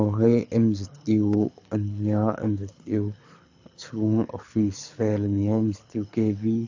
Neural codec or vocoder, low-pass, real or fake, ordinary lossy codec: codec, 24 kHz, 6 kbps, HILCodec; 7.2 kHz; fake; AAC, 48 kbps